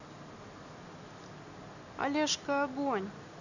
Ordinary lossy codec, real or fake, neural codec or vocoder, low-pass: none; real; none; 7.2 kHz